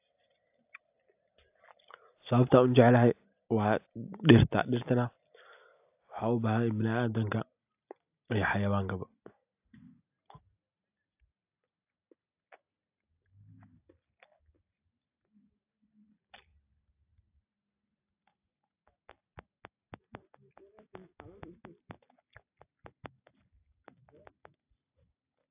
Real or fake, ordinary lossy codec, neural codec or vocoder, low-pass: real; AAC, 32 kbps; none; 3.6 kHz